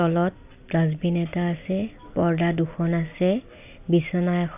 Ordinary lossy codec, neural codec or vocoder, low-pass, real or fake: AAC, 32 kbps; none; 3.6 kHz; real